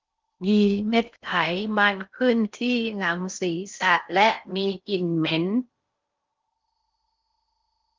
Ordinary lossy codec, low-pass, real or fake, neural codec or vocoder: Opus, 24 kbps; 7.2 kHz; fake; codec, 16 kHz in and 24 kHz out, 0.6 kbps, FocalCodec, streaming, 4096 codes